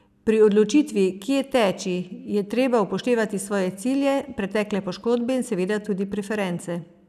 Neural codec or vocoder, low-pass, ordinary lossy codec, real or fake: none; 14.4 kHz; none; real